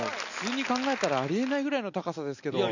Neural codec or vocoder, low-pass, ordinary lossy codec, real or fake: none; 7.2 kHz; none; real